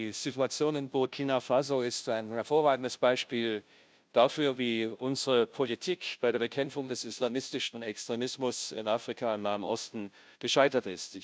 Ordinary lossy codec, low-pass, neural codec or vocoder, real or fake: none; none; codec, 16 kHz, 0.5 kbps, FunCodec, trained on Chinese and English, 25 frames a second; fake